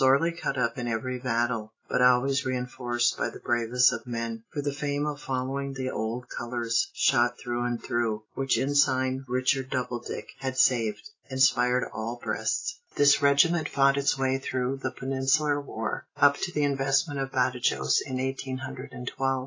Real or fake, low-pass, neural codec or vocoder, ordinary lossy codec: real; 7.2 kHz; none; AAC, 32 kbps